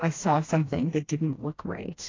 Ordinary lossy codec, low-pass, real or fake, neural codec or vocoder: AAC, 32 kbps; 7.2 kHz; fake; codec, 16 kHz, 1 kbps, FreqCodec, smaller model